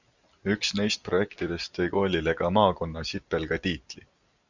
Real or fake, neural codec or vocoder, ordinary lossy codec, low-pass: real; none; Opus, 64 kbps; 7.2 kHz